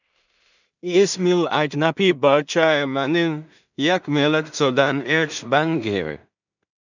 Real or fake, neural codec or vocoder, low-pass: fake; codec, 16 kHz in and 24 kHz out, 0.4 kbps, LongCat-Audio-Codec, two codebook decoder; 7.2 kHz